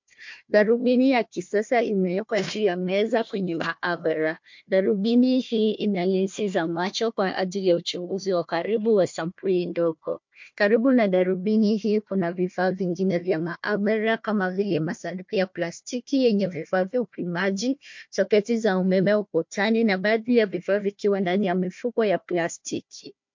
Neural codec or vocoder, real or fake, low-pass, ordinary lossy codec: codec, 16 kHz, 1 kbps, FunCodec, trained on Chinese and English, 50 frames a second; fake; 7.2 kHz; MP3, 48 kbps